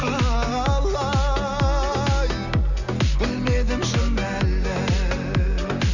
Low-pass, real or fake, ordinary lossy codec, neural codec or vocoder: 7.2 kHz; real; none; none